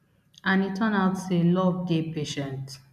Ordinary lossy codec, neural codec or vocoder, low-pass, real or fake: none; none; 14.4 kHz; real